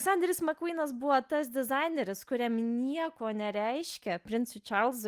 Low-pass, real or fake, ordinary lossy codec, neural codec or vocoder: 14.4 kHz; real; Opus, 32 kbps; none